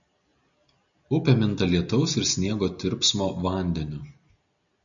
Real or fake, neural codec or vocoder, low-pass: real; none; 7.2 kHz